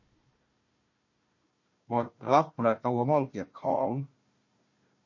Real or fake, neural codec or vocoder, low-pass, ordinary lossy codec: fake; codec, 16 kHz, 1 kbps, FunCodec, trained on Chinese and English, 50 frames a second; 7.2 kHz; MP3, 32 kbps